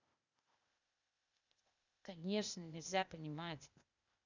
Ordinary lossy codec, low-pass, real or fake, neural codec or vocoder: none; 7.2 kHz; fake; codec, 16 kHz, 0.8 kbps, ZipCodec